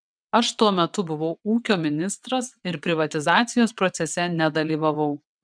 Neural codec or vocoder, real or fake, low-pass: vocoder, 22.05 kHz, 80 mel bands, WaveNeXt; fake; 9.9 kHz